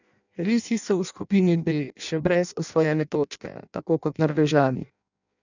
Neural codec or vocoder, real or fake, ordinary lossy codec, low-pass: codec, 16 kHz in and 24 kHz out, 0.6 kbps, FireRedTTS-2 codec; fake; none; 7.2 kHz